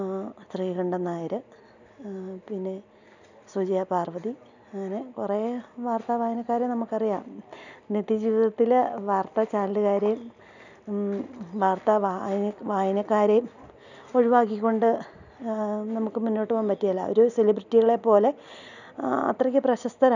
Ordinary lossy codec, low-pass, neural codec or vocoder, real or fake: none; 7.2 kHz; none; real